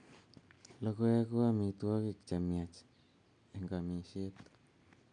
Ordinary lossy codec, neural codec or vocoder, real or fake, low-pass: none; none; real; 9.9 kHz